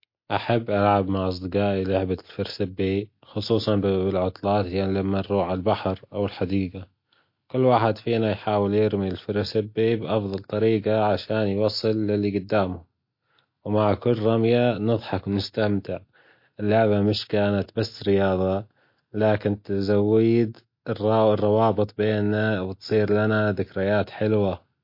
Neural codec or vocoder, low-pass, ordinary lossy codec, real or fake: none; 5.4 kHz; MP3, 32 kbps; real